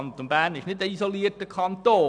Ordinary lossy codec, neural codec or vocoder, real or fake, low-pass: MP3, 96 kbps; none; real; 9.9 kHz